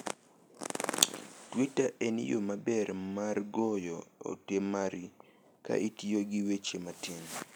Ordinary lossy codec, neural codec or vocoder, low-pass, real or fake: none; none; none; real